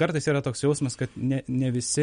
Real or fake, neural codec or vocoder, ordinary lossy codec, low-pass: real; none; MP3, 48 kbps; 9.9 kHz